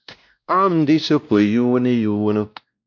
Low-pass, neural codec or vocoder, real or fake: 7.2 kHz; codec, 16 kHz, 1 kbps, X-Codec, WavLM features, trained on Multilingual LibriSpeech; fake